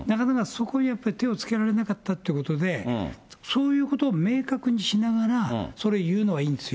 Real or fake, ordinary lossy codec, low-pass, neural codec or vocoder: real; none; none; none